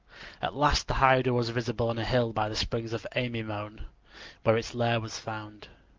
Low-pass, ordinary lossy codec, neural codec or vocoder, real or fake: 7.2 kHz; Opus, 32 kbps; none; real